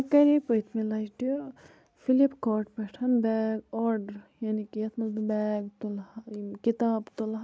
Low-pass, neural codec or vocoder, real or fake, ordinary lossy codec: none; none; real; none